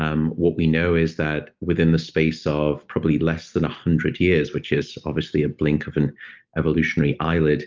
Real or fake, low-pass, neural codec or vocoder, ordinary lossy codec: real; 7.2 kHz; none; Opus, 32 kbps